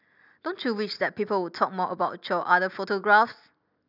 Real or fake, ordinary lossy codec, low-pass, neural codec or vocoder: real; none; 5.4 kHz; none